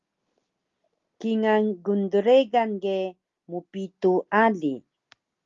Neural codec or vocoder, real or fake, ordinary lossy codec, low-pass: none; real; Opus, 32 kbps; 7.2 kHz